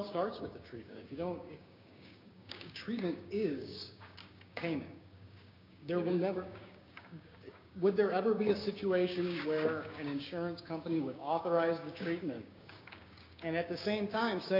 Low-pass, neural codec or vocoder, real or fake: 5.4 kHz; codec, 16 kHz, 6 kbps, DAC; fake